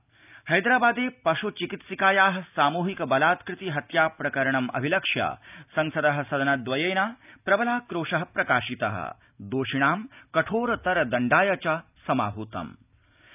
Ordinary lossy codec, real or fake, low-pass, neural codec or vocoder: none; real; 3.6 kHz; none